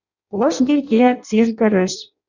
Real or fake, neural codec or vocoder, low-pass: fake; codec, 16 kHz in and 24 kHz out, 0.6 kbps, FireRedTTS-2 codec; 7.2 kHz